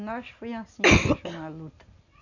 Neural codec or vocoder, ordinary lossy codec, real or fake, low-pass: none; none; real; 7.2 kHz